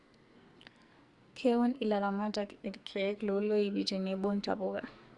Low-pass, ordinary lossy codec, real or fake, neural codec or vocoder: 10.8 kHz; Opus, 64 kbps; fake; codec, 44.1 kHz, 2.6 kbps, SNAC